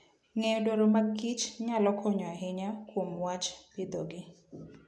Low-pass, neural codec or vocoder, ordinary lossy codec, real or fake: none; none; none; real